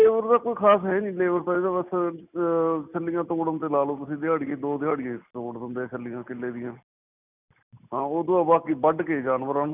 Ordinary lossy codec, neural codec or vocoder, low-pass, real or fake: none; none; 3.6 kHz; real